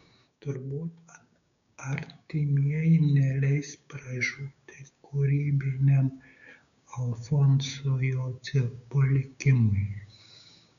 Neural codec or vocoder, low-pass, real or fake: codec, 16 kHz, 6 kbps, DAC; 7.2 kHz; fake